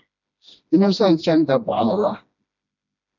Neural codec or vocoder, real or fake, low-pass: codec, 16 kHz, 1 kbps, FreqCodec, smaller model; fake; 7.2 kHz